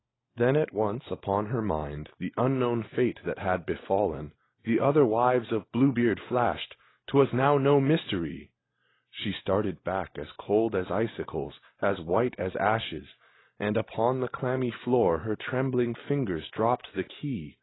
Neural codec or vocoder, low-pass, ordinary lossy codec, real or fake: none; 7.2 kHz; AAC, 16 kbps; real